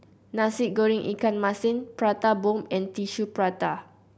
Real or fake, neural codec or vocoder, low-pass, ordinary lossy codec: real; none; none; none